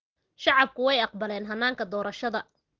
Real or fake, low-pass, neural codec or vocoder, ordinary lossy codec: real; 7.2 kHz; none; Opus, 16 kbps